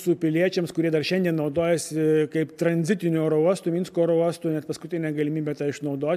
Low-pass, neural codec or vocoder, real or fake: 14.4 kHz; none; real